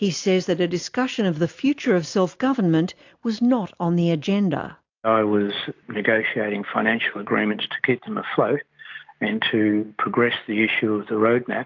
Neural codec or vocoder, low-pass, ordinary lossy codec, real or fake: none; 7.2 kHz; AAC, 48 kbps; real